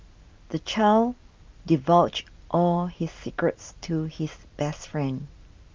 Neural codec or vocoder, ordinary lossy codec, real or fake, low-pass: none; Opus, 24 kbps; real; 7.2 kHz